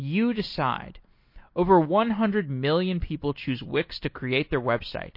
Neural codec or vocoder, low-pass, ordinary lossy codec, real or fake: none; 5.4 kHz; MP3, 32 kbps; real